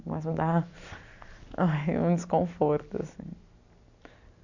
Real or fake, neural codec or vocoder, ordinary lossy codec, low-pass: real; none; none; 7.2 kHz